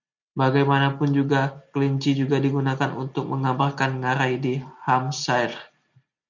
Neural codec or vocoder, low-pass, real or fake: none; 7.2 kHz; real